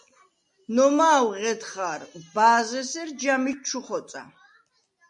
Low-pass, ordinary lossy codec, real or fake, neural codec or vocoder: 10.8 kHz; MP3, 48 kbps; real; none